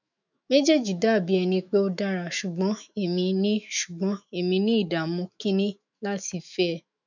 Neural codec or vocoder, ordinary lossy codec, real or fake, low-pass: autoencoder, 48 kHz, 128 numbers a frame, DAC-VAE, trained on Japanese speech; none; fake; 7.2 kHz